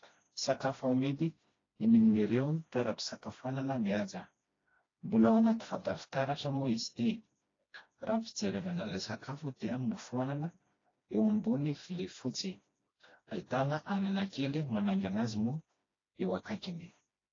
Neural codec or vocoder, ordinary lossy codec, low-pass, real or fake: codec, 16 kHz, 1 kbps, FreqCodec, smaller model; AAC, 32 kbps; 7.2 kHz; fake